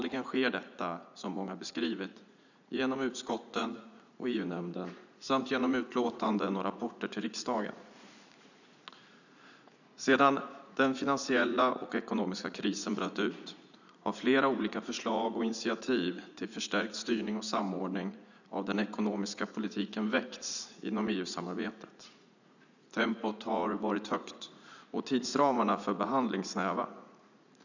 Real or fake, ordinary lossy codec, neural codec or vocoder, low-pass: fake; none; vocoder, 44.1 kHz, 80 mel bands, Vocos; 7.2 kHz